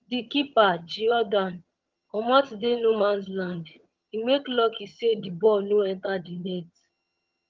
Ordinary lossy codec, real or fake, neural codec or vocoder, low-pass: Opus, 32 kbps; fake; vocoder, 22.05 kHz, 80 mel bands, HiFi-GAN; 7.2 kHz